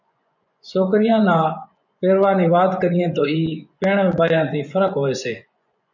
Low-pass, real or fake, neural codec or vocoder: 7.2 kHz; fake; vocoder, 24 kHz, 100 mel bands, Vocos